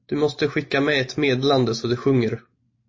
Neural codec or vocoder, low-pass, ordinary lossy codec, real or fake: none; 7.2 kHz; MP3, 32 kbps; real